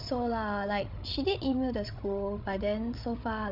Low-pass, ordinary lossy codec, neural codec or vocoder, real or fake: 5.4 kHz; none; codec, 16 kHz, 8 kbps, FreqCodec, larger model; fake